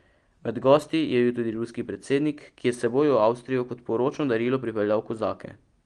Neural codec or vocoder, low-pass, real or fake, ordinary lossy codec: none; 9.9 kHz; real; Opus, 24 kbps